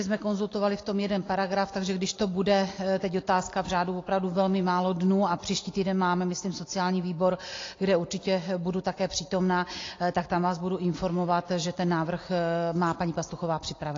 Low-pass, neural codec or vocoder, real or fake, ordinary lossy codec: 7.2 kHz; none; real; AAC, 32 kbps